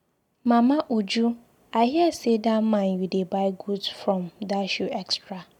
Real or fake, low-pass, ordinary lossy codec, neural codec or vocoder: real; 19.8 kHz; none; none